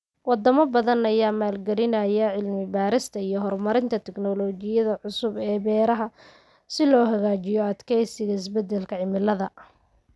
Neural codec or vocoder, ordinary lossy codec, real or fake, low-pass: none; none; real; none